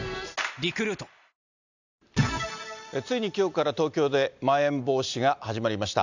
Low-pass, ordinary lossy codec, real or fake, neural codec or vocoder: 7.2 kHz; none; real; none